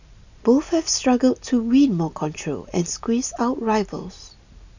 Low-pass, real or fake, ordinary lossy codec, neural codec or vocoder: 7.2 kHz; real; none; none